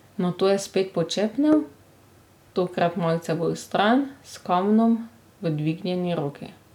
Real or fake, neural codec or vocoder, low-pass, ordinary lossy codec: real; none; 19.8 kHz; none